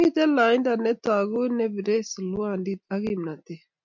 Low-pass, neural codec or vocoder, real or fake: 7.2 kHz; none; real